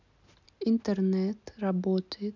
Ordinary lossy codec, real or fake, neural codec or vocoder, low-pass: none; real; none; 7.2 kHz